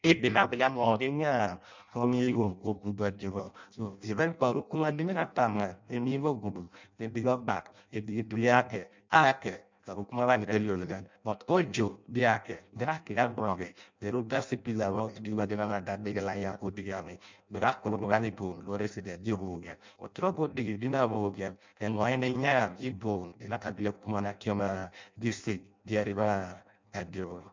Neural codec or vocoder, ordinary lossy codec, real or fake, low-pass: codec, 16 kHz in and 24 kHz out, 0.6 kbps, FireRedTTS-2 codec; MP3, 64 kbps; fake; 7.2 kHz